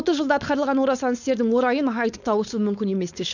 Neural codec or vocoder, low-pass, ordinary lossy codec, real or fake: codec, 16 kHz, 4.8 kbps, FACodec; 7.2 kHz; none; fake